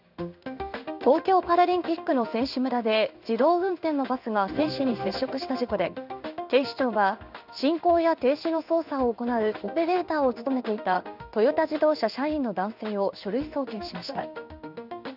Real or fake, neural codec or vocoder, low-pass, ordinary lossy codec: fake; codec, 16 kHz in and 24 kHz out, 1 kbps, XY-Tokenizer; 5.4 kHz; none